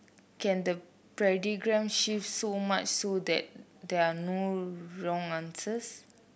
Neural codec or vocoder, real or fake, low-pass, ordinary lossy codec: none; real; none; none